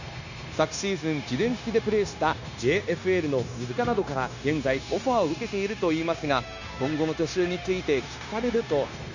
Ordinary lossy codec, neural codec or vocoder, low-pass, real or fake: none; codec, 16 kHz, 0.9 kbps, LongCat-Audio-Codec; 7.2 kHz; fake